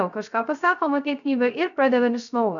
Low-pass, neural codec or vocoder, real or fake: 7.2 kHz; codec, 16 kHz, 0.3 kbps, FocalCodec; fake